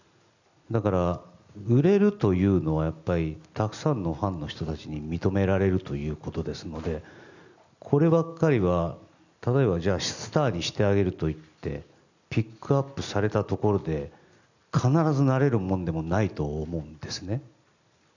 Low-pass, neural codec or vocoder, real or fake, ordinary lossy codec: 7.2 kHz; none; real; none